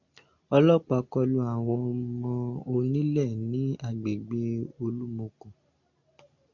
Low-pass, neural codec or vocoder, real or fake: 7.2 kHz; none; real